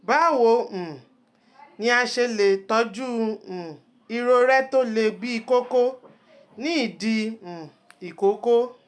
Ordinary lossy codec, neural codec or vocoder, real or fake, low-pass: none; none; real; none